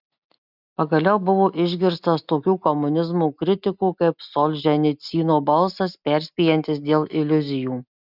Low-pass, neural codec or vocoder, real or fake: 5.4 kHz; none; real